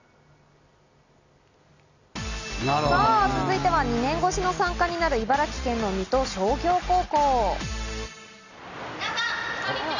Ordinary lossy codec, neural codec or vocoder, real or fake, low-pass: none; none; real; 7.2 kHz